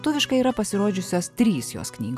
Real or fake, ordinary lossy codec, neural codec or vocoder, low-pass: real; AAC, 96 kbps; none; 14.4 kHz